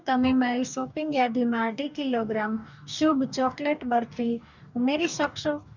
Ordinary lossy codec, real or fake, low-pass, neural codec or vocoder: none; fake; 7.2 kHz; codec, 44.1 kHz, 2.6 kbps, DAC